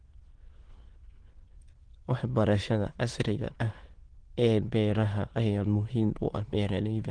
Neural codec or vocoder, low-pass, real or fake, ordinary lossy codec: autoencoder, 22.05 kHz, a latent of 192 numbers a frame, VITS, trained on many speakers; 9.9 kHz; fake; Opus, 16 kbps